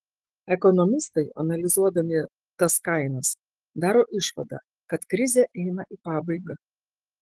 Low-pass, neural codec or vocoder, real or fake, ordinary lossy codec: 10.8 kHz; autoencoder, 48 kHz, 128 numbers a frame, DAC-VAE, trained on Japanese speech; fake; Opus, 16 kbps